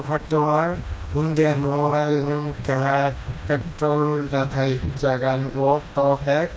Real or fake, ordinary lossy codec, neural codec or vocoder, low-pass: fake; none; codec, 16 kHz, 1 kbps, FreqCodec, smaller model; none